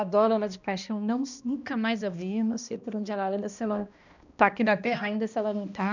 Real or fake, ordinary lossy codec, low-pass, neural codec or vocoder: fake; none; 7.2 kHz; codec, 16 kHz, 1 kbps, X-Codec, HuBERT features, trained on balanced general audio